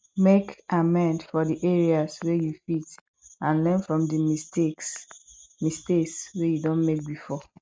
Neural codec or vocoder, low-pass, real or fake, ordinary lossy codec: none; none; real; none